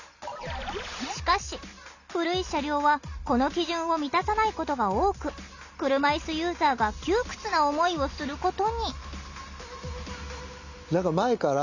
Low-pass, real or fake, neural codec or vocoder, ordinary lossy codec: 7.2 kHz; real; none; none